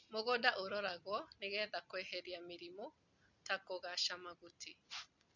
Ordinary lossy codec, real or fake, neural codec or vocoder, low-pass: none; real; none; 7.2 kHz